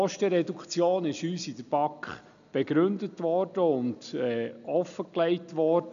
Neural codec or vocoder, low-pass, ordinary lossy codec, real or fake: none; 7.2 kHz; none; real